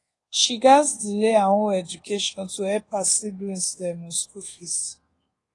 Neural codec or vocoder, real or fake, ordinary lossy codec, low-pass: codec, 24 kHz, 1.2 kbps, DualCodec; fake; AAC, 32 kbps; 10.8 kHz